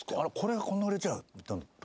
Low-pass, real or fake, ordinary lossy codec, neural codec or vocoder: none; real; none; none